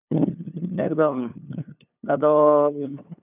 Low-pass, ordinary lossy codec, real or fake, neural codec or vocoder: 3.6 kHz; none; fake; codec, 16 kHz, 2 kbps, FunCodec, trained on LibriTTS, 25 frames a second